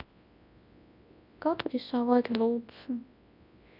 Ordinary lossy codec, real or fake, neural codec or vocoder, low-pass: none; fake; codec, 24 kHz, 0.9 kbps, WavTokenizer, large speech release; 5.4 kHz